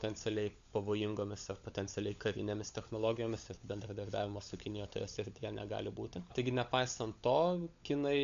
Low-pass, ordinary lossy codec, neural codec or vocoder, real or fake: 7.2 kHz; MP3, 64 kbps; codec, 16 kHz, 16 kbps, FunCodec, trained on LibriTTS, 50 frames a second; fake